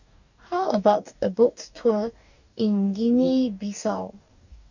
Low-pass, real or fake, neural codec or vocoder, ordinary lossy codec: 7.2 kHz; fake; codec, 44.1 kHz, 2.6 kbps, DAC; none